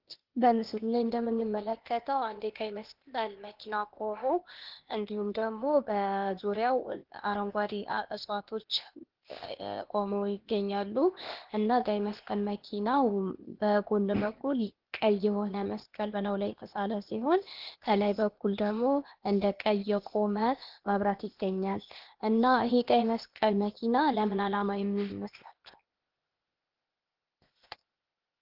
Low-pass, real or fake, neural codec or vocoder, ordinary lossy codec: 5.4 kHz; fake; codec, 16 kHz, 0.8 kbps, ZipCodec; Opus, 16 kbps